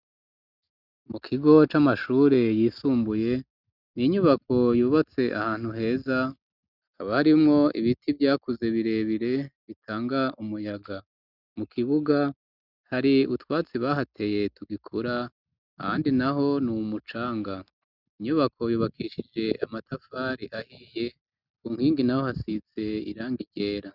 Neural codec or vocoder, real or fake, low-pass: none; real; 5.4 kHz